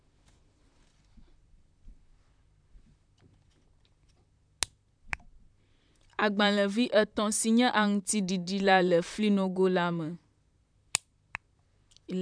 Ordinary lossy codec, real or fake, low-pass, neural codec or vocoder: none; fake; 9.9 kHz; vocoder, 44.1 kHz, 128 mel bands, Pupu-Vocoder